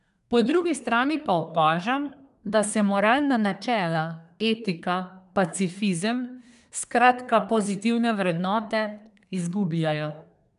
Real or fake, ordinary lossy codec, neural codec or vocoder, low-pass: fake; none; codec, 24 kHz, 1 kbps, SNAC; 10.8 kHz